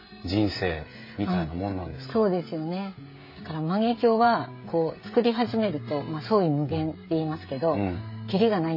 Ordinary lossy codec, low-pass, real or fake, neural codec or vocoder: MP3, 24 kbps; 5.4 kHz; fake; codec, 16 kHz, 16 kbps, FreqCodec, smaller model